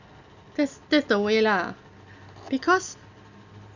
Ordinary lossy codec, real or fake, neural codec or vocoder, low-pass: none; real; none; 7.2 kHz